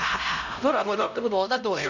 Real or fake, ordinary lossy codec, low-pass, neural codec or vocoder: fake; none; 7.2 kHz; codec, 16 kHz, 0.5 kbps, X-Codec, HuBERT features, trained on LibriSpeech